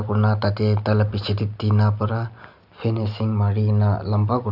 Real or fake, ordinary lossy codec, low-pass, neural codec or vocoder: real; none; 5.4 kHz; none